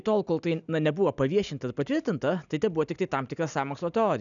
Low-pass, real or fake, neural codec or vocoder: 7.2 kHz; real; none